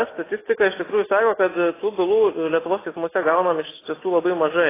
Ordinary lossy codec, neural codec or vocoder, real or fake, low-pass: AAC, 16 kbps; none; real; 3.6 kHz